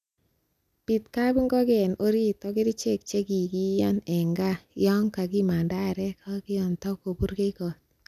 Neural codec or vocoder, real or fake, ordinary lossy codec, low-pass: none; real; none; 14.4 kHz